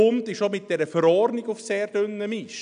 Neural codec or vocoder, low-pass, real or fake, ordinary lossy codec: none; 9.9 kHz; real; none